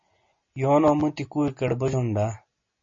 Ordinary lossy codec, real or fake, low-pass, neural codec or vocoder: MP3, 32 kbps; real; 7.2 kHz; none